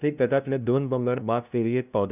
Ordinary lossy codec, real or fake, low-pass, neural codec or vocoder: none; fake; 3.6 kHz; codec, 16 kHz, 0.5 kbps, FunCodec, trained on LibriTTS, 25 frames a second